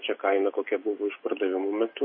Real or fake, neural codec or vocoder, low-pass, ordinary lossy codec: real; none; 5.4 kHz; MP3, 24 kbps